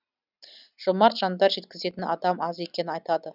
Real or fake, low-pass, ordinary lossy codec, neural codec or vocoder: real; 5.4 kHz; none; none